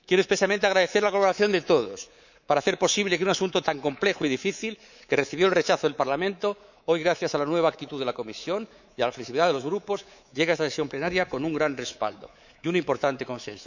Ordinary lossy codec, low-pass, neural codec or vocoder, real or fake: none; 7.2 kHz; codec, 24 kHz, 3.1 kbps, DualCodec; fake